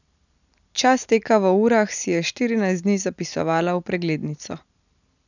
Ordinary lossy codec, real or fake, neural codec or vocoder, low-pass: none; real; none; 7.2 kHz